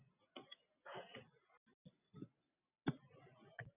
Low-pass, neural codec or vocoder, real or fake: 3.6 kHz; none; real